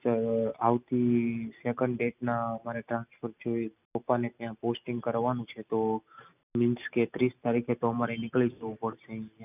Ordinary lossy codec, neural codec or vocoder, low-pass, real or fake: none; none; 3.6 kHz; real